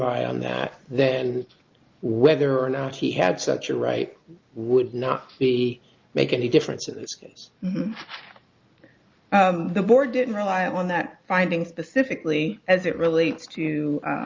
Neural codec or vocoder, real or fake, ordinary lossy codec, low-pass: none; real; Opus, 24 kbps; 7.2 kHz